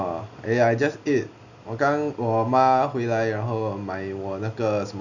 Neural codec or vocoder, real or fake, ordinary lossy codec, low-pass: none; real; none; 7.2 kHz